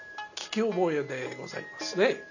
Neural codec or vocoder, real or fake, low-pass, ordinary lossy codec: none; real; 7.2 kHz; none